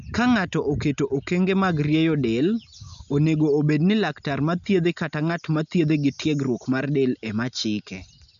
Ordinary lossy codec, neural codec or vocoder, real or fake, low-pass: none; none; real; 7.2 kHz